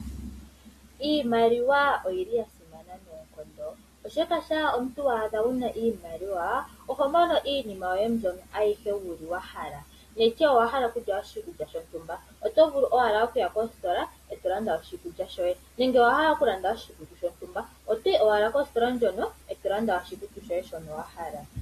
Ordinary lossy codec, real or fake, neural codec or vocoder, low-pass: AAC, 48 kbps; real; none; 14.4 kHz